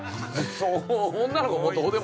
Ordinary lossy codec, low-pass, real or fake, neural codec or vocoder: none; none; real; none